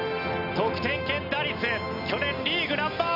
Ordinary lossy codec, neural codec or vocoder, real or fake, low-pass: none; none; real; 5.4 kHz